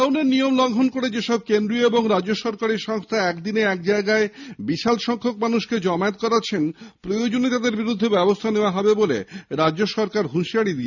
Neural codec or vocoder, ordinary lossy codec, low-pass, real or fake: none; none; 7.2 kHz; real